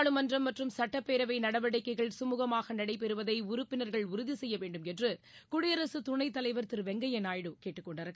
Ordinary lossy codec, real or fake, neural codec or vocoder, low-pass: none; real; none; none